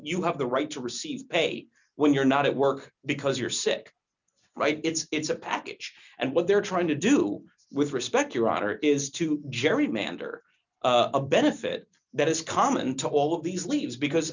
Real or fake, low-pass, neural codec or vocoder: real; 7.2 kHz; none